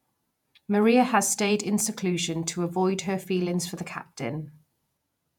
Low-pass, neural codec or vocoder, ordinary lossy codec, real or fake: 19.8 kHz; vocoder, 48 kHz, 128 mel bands, Vocos; none; fake